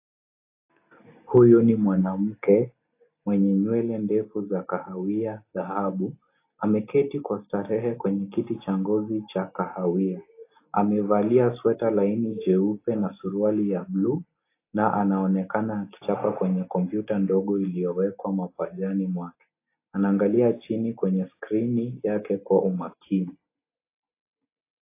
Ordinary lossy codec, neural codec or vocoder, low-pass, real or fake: AAC, 24 kbps; none; 3.6 kHz; real